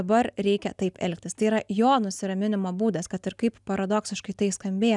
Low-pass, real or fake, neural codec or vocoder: 10.8 kHz; real; none